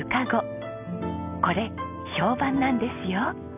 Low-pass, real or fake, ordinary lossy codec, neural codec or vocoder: 3.6 kHz; real; none; none